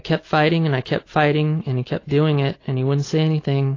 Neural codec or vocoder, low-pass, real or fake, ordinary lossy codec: none; 7.2 kHz; real; AAC, 32 kbps